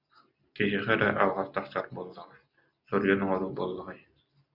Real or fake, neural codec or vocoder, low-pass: real; none; 5.4 kHz